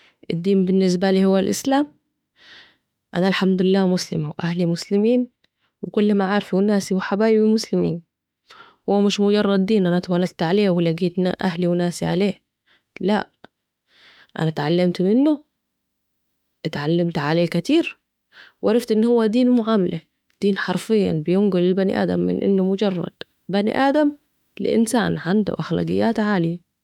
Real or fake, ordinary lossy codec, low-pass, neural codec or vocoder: fake; none; 19.8 kHz; autoencoder, 48 kHz, 32 numbers a frame, DAC-VAE, trained on Japanese speech